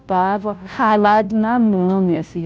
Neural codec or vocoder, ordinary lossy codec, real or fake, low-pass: codec, 16 kHz, 0.5 kbps, FunCodec, trained on Chinese and English, 25 frames a second; none; fake; none